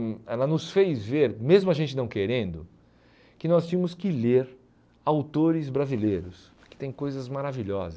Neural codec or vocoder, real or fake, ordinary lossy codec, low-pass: none; real; none; none